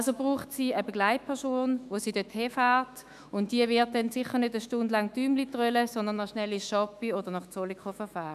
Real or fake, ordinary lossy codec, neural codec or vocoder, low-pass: fake; none; autoencoder, 48 kHz, 128 numbers a frame, DAC-VAE, trained on Japanese speech; 14.4 kHz